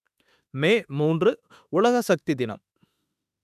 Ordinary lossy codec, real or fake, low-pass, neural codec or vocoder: none; fake; 14.4 kHz; autoencoder, 48 kHz, 32 numbers a frame, DAC-VAE, trained on Japanese speech